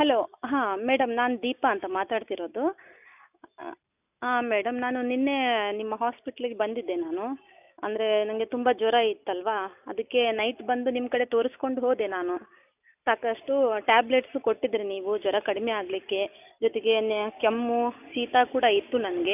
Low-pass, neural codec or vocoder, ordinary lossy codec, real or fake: 3.6 kHz; none; none; real